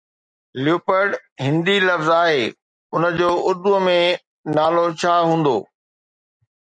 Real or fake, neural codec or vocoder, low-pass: real; none; 9.9 kHz